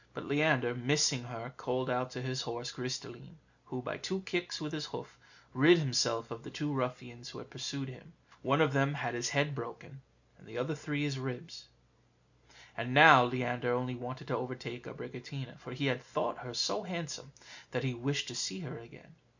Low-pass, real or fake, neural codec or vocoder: 7.2 kHz; real; none